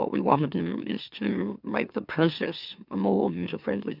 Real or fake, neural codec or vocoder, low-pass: fake; autoencoder, 44.1 kHz, a latent of 192 numbers a frame, MeloTTS; 5.4 kHz